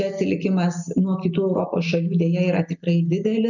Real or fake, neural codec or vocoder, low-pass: real; none; 7.2 kHz